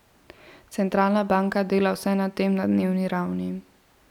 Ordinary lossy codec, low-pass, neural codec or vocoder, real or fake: none; 19.8 kHz; none; real